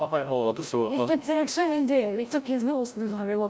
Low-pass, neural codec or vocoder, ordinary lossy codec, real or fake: none; codec, 16 kHz, 0.5 kbps, FreqCodec, larger model; none; fake